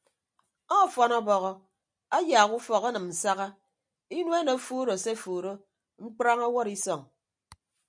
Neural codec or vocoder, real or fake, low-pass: none; real; 9.9 kHz